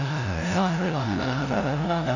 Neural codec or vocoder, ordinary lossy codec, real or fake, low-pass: codec, 16 kHz, 0.5 kbps, FunCodec, trained on LibriTTS, 25 frames a second; none; fake; 7.2 kHz